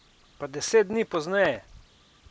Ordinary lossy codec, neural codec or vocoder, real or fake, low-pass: none; none; real; none